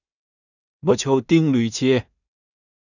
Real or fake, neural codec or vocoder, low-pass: fake; codec, 16 kHz in and 24 kHz out, 0.4 kbps, LongCat-Audio-Codec, two codebook decoder; 7.2 kHz